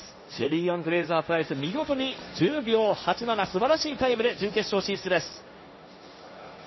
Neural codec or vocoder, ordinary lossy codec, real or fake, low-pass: codec, 16 kHz, 1.1 kbps, Voila-Tokenizer; MP3, 24 kbps; fake; 7.2 kHz